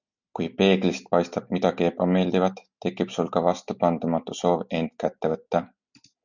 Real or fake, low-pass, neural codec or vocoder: real; 7.2 kHz; none